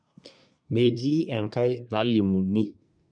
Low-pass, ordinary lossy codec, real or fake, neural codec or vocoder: 9.9 kHz; none; fake; codec, 24 kHz, 1 kbps, SNAC